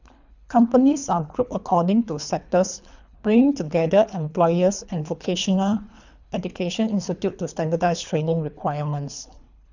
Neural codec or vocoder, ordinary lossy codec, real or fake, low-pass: codec, 24 kHz, 3 kbps, HILCodec; none; fake; 7.2 kHz